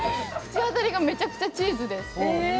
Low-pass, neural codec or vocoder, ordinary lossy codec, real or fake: none; none; none; real